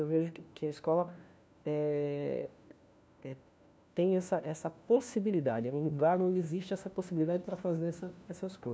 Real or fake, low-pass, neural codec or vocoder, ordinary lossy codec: fake; none; codec, 16 kHz, 1 kbps, FunCodec, trained on LibriTTS, 50 frames a second; none